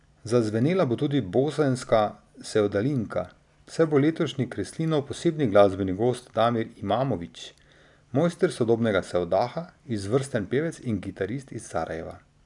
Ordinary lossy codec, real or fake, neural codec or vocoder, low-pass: none; real; none; 10.8 kHz